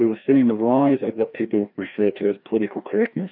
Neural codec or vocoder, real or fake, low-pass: codec, 16 kHz, 1 kbps, FreqCodec, larger model; fake; 5.4 kHz